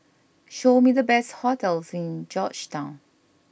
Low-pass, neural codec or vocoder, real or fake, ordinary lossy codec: none; none; real; none